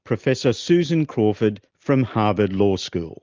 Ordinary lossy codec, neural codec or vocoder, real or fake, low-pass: Opus, 24 kbps; none; real; 7.2 kHz